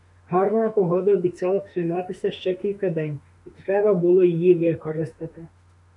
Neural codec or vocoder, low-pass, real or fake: autoencoder, 48 kHz, 32 numbers a frame, DAC-VAE, trained on Japanese speech; 10.8 kHz; fake